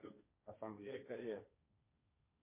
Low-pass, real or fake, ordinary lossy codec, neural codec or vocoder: 3.6 kHz; fake; MP3, 24 kbps; codec, 16 kHz, 1.1 kbps, Voila-Tokenizer